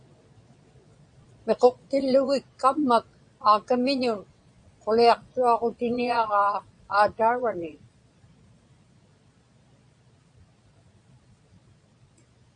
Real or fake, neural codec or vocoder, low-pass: fake; vocoder, 22.05 kHz, 80 mel bands, Vocos; 9.9 kHz